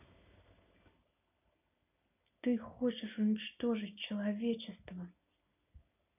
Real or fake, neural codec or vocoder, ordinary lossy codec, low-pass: real; none; none; 3.6 kHz